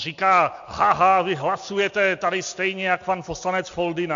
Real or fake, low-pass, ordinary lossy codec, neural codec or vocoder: real; 7.2 kHz; AAC, 64 kbps; none